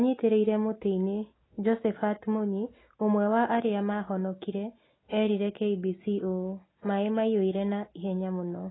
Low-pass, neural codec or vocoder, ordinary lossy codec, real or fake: 7.2 kHz; none; AAC, 16 kbps; real